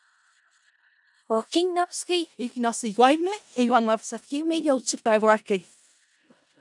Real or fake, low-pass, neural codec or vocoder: fake; 10.8 kHz; codec, 16 kHz in and 24 kHz out, 0.4 kbps, LongCat-Audio-Codec, four codebook decoder